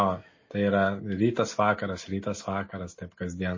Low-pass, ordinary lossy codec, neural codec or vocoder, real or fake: 7.2 kHz; MP3, 32 kbps; none; real